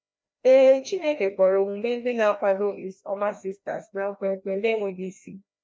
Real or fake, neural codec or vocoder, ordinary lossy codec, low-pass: fake; codec, 16 kHz, 1 kbps, FreqCodec, larger model; none; none